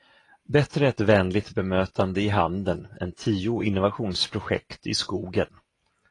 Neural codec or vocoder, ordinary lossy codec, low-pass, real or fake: none; AAC, 32 kbps; 10.8 kHz; real